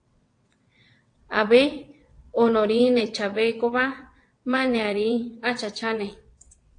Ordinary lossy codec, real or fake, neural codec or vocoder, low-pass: AAC, 48 kbps; fake; vocoder, 22.05 kHz, 80 mel bands, WaveNeXt; 9.9 kHz